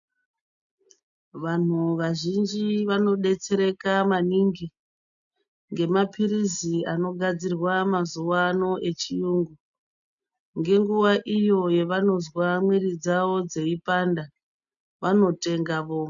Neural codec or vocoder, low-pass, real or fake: none; 7.2 kHz; real